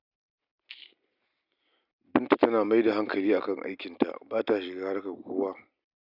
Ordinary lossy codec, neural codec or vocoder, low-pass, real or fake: none; none; 5.4 kHz; real